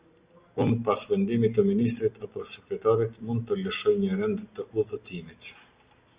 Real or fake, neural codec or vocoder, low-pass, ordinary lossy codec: real; none; 3.6 kHz; Opus, 64 kbps